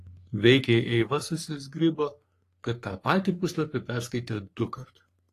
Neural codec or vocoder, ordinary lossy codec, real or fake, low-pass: codec, 44.1 kHz, 3.4 kbps, Pupu-Codec; AAC, 48 kbps; fake; 14.4 kHz